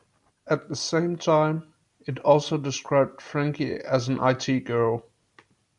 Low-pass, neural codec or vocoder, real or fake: 10.8 kHz; none; real